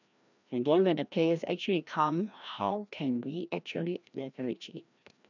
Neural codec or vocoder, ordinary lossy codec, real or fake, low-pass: codec, 16 kHz, 1 kbps, FreqCodec, larger model; none; fake; 7.2 kHz